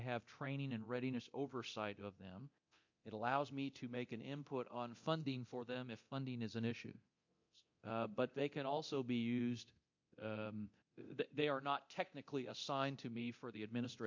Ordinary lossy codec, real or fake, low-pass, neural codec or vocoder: MP3, 48 kbps; fake; 7.2 kHz; codec, 24 kHz, 0.9 kbps, DualCodec